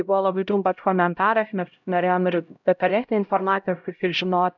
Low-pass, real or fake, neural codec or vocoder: 7.2 kHz; fake; codec, 16 kHz, 0.5 kbps, X-Codec, HuBERT features, trained on LibriSpeech